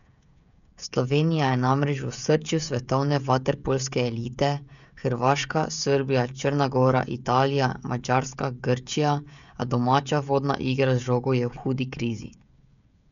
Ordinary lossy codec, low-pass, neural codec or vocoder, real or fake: none; 7.2 kHz; codec, 16 kHz, 16 kbps, FreqCodec, smaller model; fake